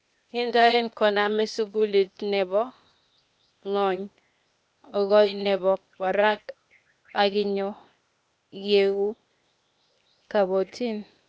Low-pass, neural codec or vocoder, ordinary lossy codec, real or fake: none; codec, 16 kHz, 0.8 kbps, ZipCodec; none; fake